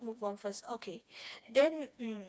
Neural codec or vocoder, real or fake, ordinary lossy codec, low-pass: codec, 16 kHz, 2 kbps, FreqCodec, smaller model; fake; none; none